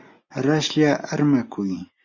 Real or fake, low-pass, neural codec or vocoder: real; 7.2 kHz; none